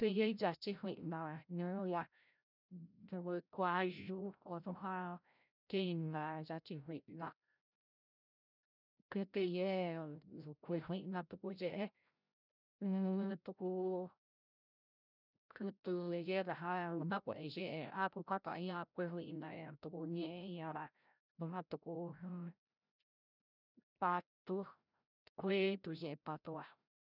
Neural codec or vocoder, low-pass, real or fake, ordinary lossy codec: codec, 16 kHz, 0.5 kbps, FreqCodec, larger model; 5.4 kHz; fake; none